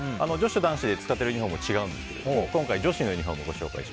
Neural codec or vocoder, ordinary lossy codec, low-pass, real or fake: none; none; none; real